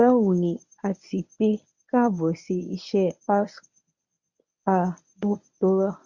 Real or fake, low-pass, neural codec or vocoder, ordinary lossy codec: fake; 7.2 kHz; codec, 24 kHz, 0.9 kbps, WavTokenizer, medium speech release version 1; none